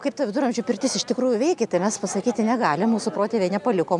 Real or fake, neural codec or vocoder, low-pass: real; none; 10.8 kHz